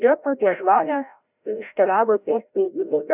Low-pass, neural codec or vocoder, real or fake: 3.6 kHz; codec, 16 kHz, 0.5 kbps, FreqCodec, larger model; fake